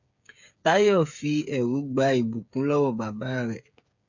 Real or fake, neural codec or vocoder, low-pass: fake; codec, 16 kHz, 8 kbps, FreqCodec, smaller model; 7.2 kHz